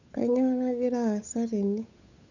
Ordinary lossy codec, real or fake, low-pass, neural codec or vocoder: none; fake; 7.2 kHz; codec, 16 kHz, 8 kbps, FunCodec, trained on Chinese and English, 25 frames a second